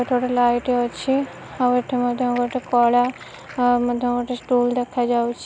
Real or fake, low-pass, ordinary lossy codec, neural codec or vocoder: real; none; none; none